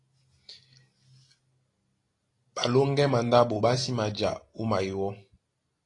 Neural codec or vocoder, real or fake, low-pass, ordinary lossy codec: none; real; 10.8 kHz; AAC, 32 kbps